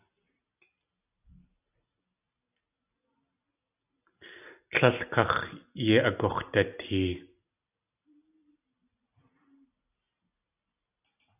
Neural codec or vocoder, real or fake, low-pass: none; real; 3.6 kHz